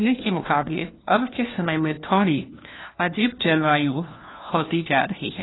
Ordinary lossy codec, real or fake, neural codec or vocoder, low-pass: AAC, 16 kbps; fake; codec, 16 kHz, 1 kbps, FunCodec, trained on LibriTTS, 50 frames a second; 7.2 kHz